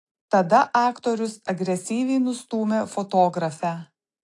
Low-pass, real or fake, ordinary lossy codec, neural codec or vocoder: 10.8 kHz; real; AAC, 48 kbps; none